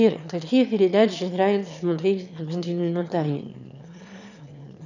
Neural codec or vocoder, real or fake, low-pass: autoencoder, 22.05 kHz, a latent of 192 numbers a frame, VITS, trained on one speaker; fake; 7.2 kHz